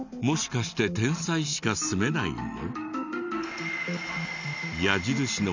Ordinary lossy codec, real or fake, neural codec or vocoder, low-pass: none; real; none; 7.2 kHz